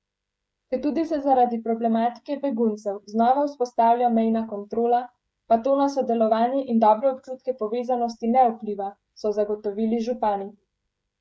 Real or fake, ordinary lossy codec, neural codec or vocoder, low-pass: fake; none; codec, 16 kHz, 16 kbps, FreqCodec, smaller model; none